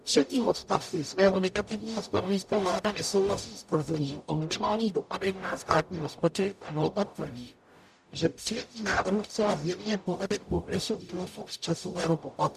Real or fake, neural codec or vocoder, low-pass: fake; codec, 44.1 kHz, 0.9 kbps, DAC; 14.4 kHz